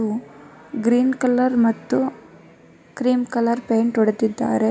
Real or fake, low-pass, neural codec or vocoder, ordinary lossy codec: real; none; none; none